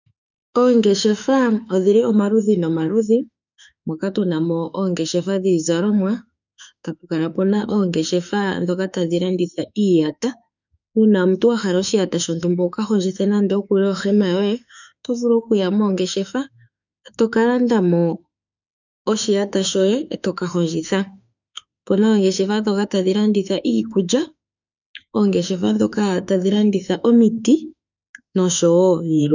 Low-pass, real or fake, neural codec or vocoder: 7.2 kHz; fake; autoencoder, 48 kHz, 32 numbers a frame, DAC-VAE, trained on Japanese speech